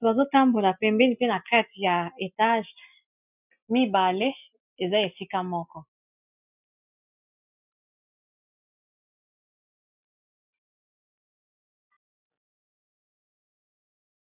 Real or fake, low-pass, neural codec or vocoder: real; 3.6 kHz; none